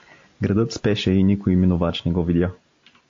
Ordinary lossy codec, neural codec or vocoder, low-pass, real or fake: AAC, 48 kbps; none; 7.2 kHz; real